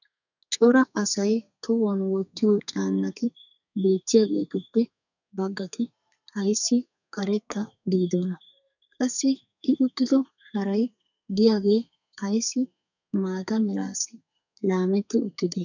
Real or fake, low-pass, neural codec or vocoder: fake; 7.2 kHz; codec, 32 kHz, 1.9 kbps, SNAC